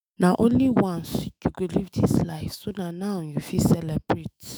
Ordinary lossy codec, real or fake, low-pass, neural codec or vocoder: none; fake; none; autoencoder, 48 kHz, 128 numbers a frame, DAC-VAE, trained on Japanese speech